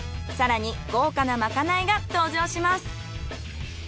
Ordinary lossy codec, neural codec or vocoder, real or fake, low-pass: none; none; real; none